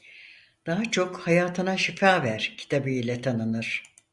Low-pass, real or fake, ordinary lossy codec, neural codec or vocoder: 10.8 kHz; real; Opus, 64 kbps; none